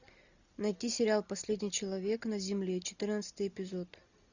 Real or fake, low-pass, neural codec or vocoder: real; 7.2 kHz; none